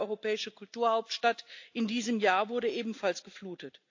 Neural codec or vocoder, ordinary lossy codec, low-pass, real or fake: none; AAC, 48 kbps; 7.2 kHz; real